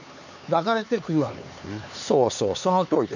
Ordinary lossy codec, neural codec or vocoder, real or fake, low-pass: none; codec, 16 kHz, 4 kbps, X-Codec, HuBERT features, trained on LibriSpeech; fake; 7.2 kHz